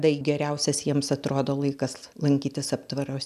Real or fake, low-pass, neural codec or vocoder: real; 14.4 kHz; none